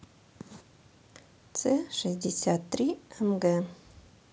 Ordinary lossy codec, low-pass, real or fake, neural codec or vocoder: none; none; real; none